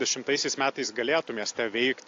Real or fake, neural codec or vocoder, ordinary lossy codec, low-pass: real; none; AAC, 48 kbps; 7.2 kHz